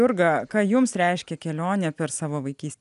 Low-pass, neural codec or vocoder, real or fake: 10.8 kHz; none; real